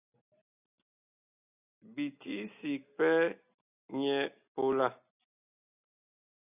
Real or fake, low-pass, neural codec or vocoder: real; 3.6 kHz; none